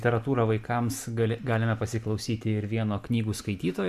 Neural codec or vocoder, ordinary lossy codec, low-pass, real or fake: none; MP3, 96 kbps; 14.4 kHz; real